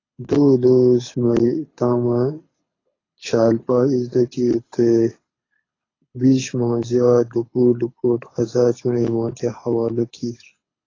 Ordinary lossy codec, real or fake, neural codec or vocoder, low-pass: AAC, 32 kbps; fake; codec, 24 kHz, 6 kbps, HILCodec; 7.2 kHz